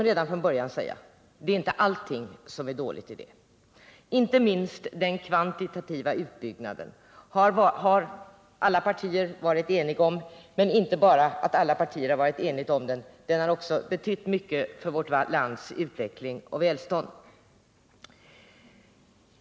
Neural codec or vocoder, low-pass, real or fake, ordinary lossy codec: none; none; real; none